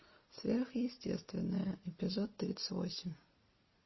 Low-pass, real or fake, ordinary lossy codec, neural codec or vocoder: 7.2 kHz; real; MP3, 24 kbps; none